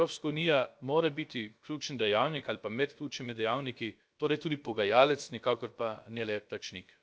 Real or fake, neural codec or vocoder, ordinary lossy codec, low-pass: fake; codec, 16 kHz, about 1 kbps, DyCAST, with the encoder's durations; none; none